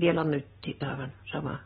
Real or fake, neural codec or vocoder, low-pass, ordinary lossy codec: real; none; 7.2 kHz; AAC, 16 kbps